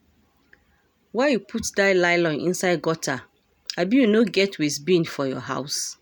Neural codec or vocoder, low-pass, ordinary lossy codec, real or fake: none; none; none; real